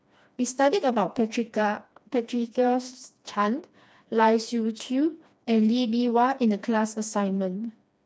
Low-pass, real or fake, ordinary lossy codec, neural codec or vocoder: none; fake; none; codec, 16 kHz, 2 kbps, FreqCodec, smaller model